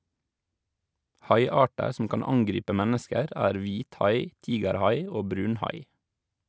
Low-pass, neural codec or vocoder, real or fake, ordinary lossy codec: none; none; real; none